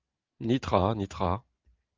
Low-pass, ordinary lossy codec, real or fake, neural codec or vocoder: 7.2 kHz; Opus, 32 kbps; real; none